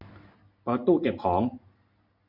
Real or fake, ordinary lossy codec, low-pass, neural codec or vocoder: real; none; 5.4 kHz; none